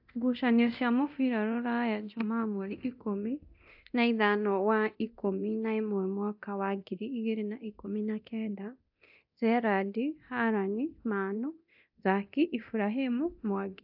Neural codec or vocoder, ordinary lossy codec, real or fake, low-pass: codec, 24 kHz, 0.9 kbps, DualCodec; AAC, 48 kbps; fake; 5.4 kHz